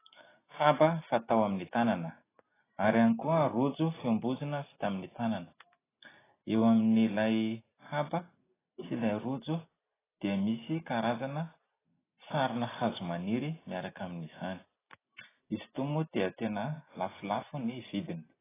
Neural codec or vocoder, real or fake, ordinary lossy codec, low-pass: none; real; AAC, 16 kbps; 3.6 kHz